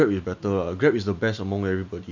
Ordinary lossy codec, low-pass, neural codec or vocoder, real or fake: none; 7.2 kHz; none; real